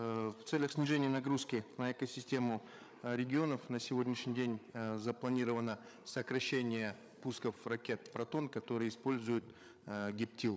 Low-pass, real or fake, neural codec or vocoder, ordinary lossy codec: none; fake; codec, 16 kHz, 8 kbps, FreqCodec, larger model; none